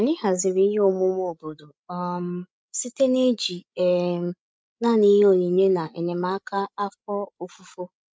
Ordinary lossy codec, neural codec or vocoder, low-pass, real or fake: none; codec, 16 kHz, 16 kbps, FreqCodec, larger model; none; fake